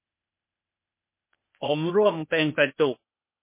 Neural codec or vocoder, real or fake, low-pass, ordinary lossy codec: codec, 16 kHz, 0.8 kbps, ZipCodec; fake; 3.6 kHz; MP3, 24 kbps